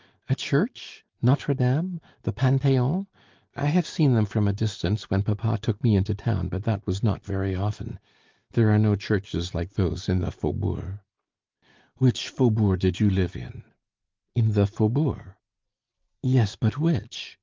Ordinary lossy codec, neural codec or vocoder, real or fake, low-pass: Opus, 32 kbps; none; real; 7.2 kHz